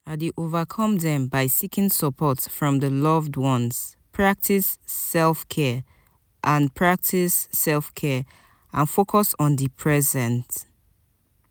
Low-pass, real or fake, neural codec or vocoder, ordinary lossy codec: none; real; none; none